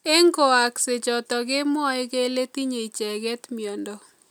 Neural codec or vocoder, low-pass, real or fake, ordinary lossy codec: none; none; real; none